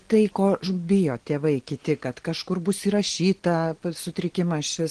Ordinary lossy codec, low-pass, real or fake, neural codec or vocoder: Opus, 16 kbps; 9.9 kHz; real; none